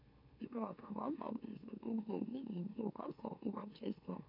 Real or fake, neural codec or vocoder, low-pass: fake; autoencoder, 44.1 kHz, a latent of 192 numbers a frame, MeloTTS; 5.4 kHz